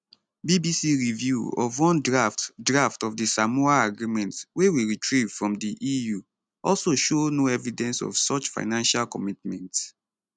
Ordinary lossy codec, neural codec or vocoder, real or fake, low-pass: none; none; real; none